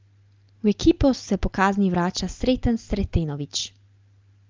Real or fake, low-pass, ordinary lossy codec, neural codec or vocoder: real; 7.2 kHz; Opus, 24 kbps; none